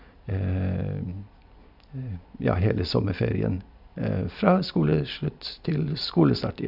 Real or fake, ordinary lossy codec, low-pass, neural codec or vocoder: real; none; 5.4 kHz; none